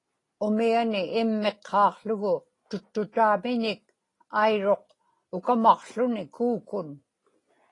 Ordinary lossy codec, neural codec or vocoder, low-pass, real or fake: AAC, 32 kbps; none; 10.8 kHz; real